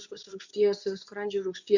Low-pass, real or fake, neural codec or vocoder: 7.2 kHz; fake; codec, 24 kHz, 0.9 kbps, WavTokenizer, medium speech release version 2